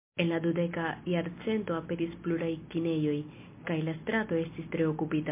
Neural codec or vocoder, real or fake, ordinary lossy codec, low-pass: none; real; MP3, 24 kbps; 3.6 kHz